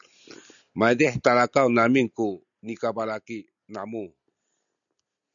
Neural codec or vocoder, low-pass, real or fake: none; 7.2 kHz; real